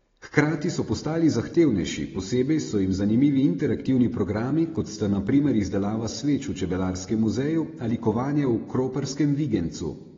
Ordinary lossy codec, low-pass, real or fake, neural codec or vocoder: AAC, 24 kbps; 7.2 kHz; real; none